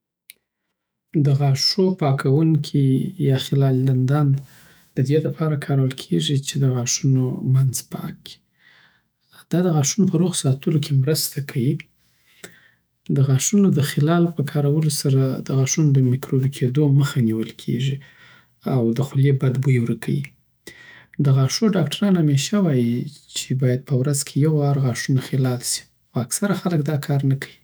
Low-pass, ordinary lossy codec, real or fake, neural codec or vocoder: none; none; fake; autoencoder, 48 kHz, 128 numbers a frame, DAC-VAE, trained on Japanese speech